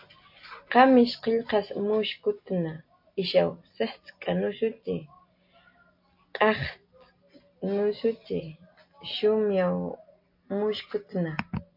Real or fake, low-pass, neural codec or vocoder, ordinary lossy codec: real; 5.4 kHz; none; MP3, 32 kbps